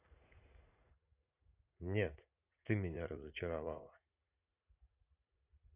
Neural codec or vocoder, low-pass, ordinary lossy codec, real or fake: vocoder, 44.1 kHz, 80 mel bands, Vocos; 3.6 kHz; none; fake